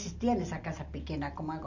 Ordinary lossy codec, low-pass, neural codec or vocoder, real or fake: none; 7.2 kHz; none; real